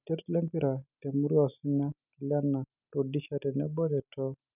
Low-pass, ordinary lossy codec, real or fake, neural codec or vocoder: 3.6 kHz; none; real; none